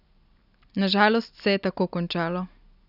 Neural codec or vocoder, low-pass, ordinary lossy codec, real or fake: none; 5.4 kHz; none; real